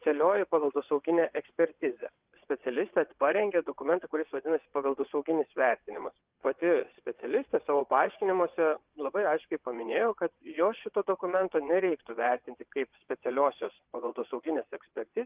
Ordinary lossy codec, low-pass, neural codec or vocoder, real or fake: Opus, 32 kbps; 3.6 kHz; vocoder, 22.05 kHz, 80 mel bands, WaveNeXt; fake